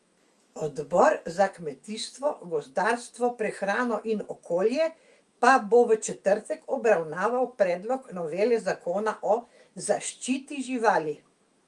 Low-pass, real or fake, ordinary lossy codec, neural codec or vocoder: 10.8 kHz; fake; Opus, 32 kbps; autoencoder, 48 kHz, 128 numbers a frame, DAC-VAE, trained on Japanese speech